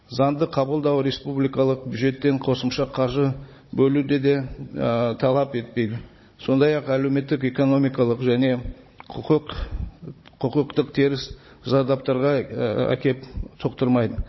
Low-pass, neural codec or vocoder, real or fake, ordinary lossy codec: 7.2 kHz; codec, 16 kHz, 16 kbps, FunCodec, trained on Chinese and English, 50 frames a second; fake; MP3, 24 kbps